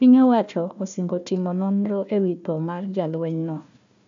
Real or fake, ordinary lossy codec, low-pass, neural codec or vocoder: fake; AAC, 64 kbps; 7.2 kHz; codec, 16 kHz, 1 kbps, FunCodec, trained on Chinese and English, 50 frames a second